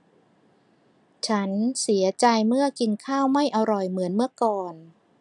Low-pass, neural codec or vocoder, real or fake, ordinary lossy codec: 10.8 kHz; none; real; none